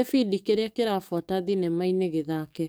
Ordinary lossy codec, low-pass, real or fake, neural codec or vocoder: none; none; fake; codec, 44.1 kHz, 7.8 kbps, DAC